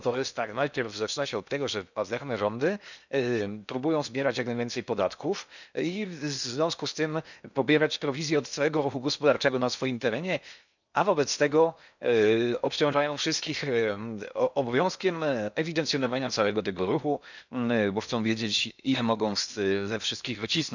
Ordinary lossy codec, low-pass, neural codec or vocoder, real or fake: none; 7.2 kHz; codec, 16 kHz in and 24 kHz out, 0.8 kbps, FocalCodec, streaming, 65536 codes; fake